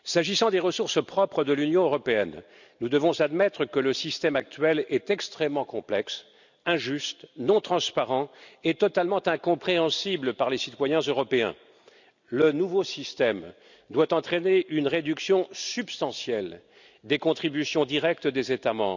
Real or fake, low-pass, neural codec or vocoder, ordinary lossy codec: real; 7.2 kHz; none; none